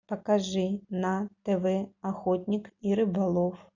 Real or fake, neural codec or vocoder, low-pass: real; none; 7.2 kHz